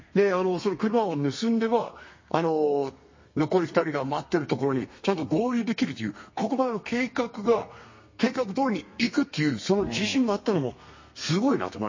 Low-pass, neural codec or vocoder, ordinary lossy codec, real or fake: 7.2 kHz; codec, 32 kHz, 1.9 kbps, SNAC; MP3, 32 kbps; fake